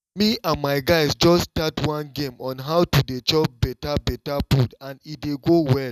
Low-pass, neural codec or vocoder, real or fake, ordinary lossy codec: 14.4 kHz; none; real; none